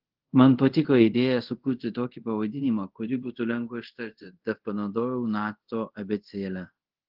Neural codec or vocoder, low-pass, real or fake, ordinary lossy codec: codec, 24 kHz, 0.5 kbps, DualCodec; 5.4 kHz; fake; Opus, 16 kbps